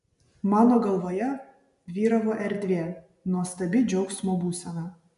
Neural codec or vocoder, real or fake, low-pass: none; real; 10.8 kHz